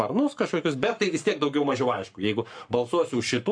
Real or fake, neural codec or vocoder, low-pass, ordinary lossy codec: fake; codec, 44.1 kHz, 7.8 kbps, Pupu-Codec; 9.9 kHz; MP3, 64 kbps